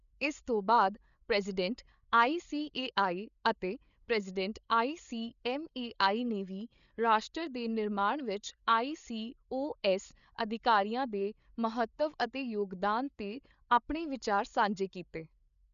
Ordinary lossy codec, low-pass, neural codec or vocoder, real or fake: none; 7.2 kHz; codec, 16 kHz, 8 kbps, FreqCodec, larger model; fake